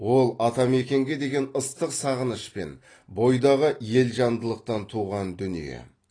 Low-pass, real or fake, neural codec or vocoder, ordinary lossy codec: 9.9 kHz; real; none; AAC, 32 kbps